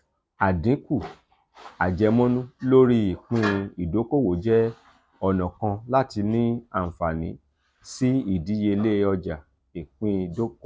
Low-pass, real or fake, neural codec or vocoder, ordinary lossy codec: none; real; none; none